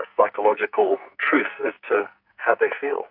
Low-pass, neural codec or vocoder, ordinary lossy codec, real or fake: 5.4 kHz; codec, 44.1 kHz, 2.6 kbps, SNAC; AAC, 48 kbps; fake